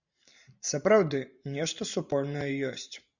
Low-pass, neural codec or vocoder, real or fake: 7.2 kHz; vocoder, 44.1 kHz, 128 mel bands every 512 samples, BigVGAN v2; fake